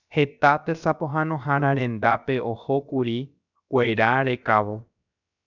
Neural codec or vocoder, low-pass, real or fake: codec, 16 kHz, about 1 kbps, DyCAST, with the encoder's durations; 7.2 kHz; fake